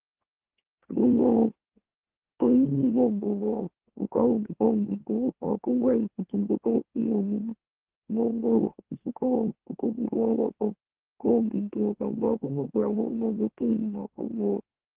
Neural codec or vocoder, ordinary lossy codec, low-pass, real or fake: autoencoder, 44.1 kHz, a latent of 192 numbers a frame, MeloTTS; Opus, 16 kbps; 3.6 kHz; fake